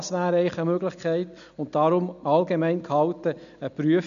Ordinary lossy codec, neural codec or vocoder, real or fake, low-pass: none; none; real; 7.2 kHz